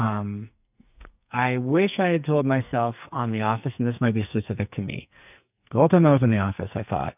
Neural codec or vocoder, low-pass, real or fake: codec, 44.1 kHz, 2.6 kbps, SNAC; 3.6 kHz; fake